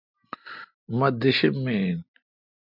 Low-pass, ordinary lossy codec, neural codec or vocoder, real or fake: 5.4 kHz; MP3, 48 kbps; none; real